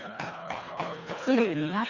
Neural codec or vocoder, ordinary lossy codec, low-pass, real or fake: codec, 24 kHz, 1.5 kbps, HILCodec; Opus, 64 kbps; 7.2 kHz; fake